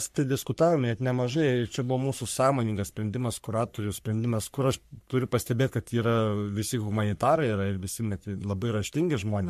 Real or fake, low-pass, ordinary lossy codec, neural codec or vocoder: fake; 14.4 kHz; MP3, 64 kbps; codec, 44.1 kHz, 3.4 kbps, Pupu-Codec